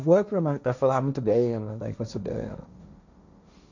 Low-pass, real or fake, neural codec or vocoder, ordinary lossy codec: 7.2 kHz; fake; codec, 16 kHz, 1.1 kbps, Voila-Tokenizer; none